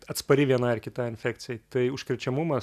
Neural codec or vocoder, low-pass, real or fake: none; 14.4 kHz; real